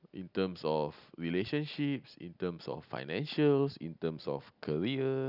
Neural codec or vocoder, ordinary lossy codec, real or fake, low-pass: none; none; real; 5.4 kHz